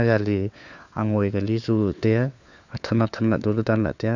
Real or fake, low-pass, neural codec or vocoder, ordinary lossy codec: fake; 7.2 kHz; codec, 16 kHz, 4 kbps, FunCodec, trained on LibriTTS, 50 frames a second; none